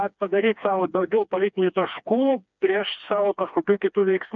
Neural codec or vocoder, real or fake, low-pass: codec, 16 kHz, 2 kbps, FreqCodec, smaller model; fake; 7.2 kHz